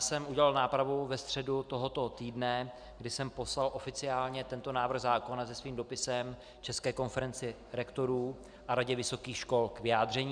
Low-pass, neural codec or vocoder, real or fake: 9.9 kHz; none; real